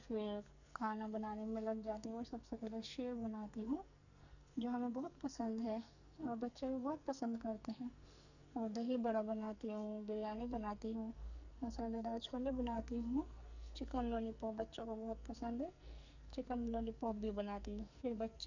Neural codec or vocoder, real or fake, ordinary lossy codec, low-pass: codec, 44.1 kHz, 2.6 kbps, SNAC; fake; none; 7.2 kHz